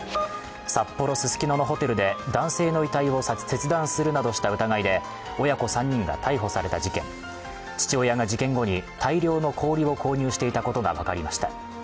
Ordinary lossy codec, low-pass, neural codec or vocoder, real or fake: none; none; none; real